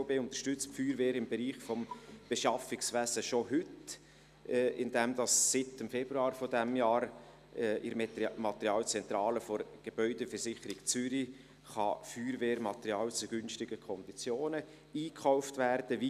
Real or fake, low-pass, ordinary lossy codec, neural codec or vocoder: real; 14.4 kHz; none; none